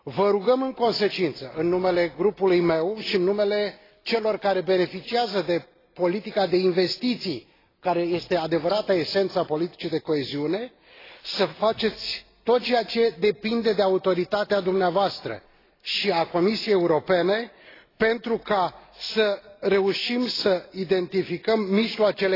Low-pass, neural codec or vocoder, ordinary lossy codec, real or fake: 5.4 kHz; none; AAC, 24 kbps; real